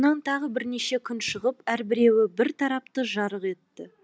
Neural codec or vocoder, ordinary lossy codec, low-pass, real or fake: none; none; none; real